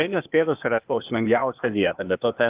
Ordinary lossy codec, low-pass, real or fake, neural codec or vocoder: Opus, 24 kbps; 3.6 kHz; fake; codec, 16 kHz, 0.8 kbps, ZipCodec